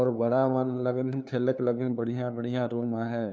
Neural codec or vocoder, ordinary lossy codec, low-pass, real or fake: codec, 16 kHz, 2 kbps, FunCodec, trained on LibriTTS, 25 frames a second; none; none; fake